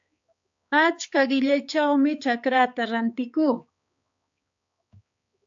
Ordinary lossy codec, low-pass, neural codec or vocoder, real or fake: AAC, 64 kbps; 7.2 kHz; codec, 16 kHz, 4 kbps, X-Codec, HuBERT features, trained on balanced general audio; fake